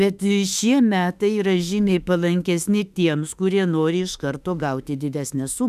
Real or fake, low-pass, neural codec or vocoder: fake; 14.4 kHz; autoencoder, 48 kHz, 32 numbers a frame, DAC-VAE, trained on Japanese speech